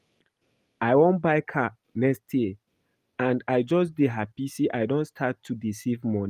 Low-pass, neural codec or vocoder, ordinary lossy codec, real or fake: 14.4 kHz; none; Opus, 32 kbps; real